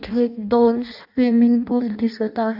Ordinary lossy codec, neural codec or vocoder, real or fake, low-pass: none; codec, 16 kHz in and 24 kHz out, 0.6 kbps, FireRedTTS-2 codec; fake; 5.4 kHz